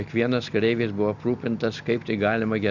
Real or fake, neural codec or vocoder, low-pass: real; none; 7.2 kHz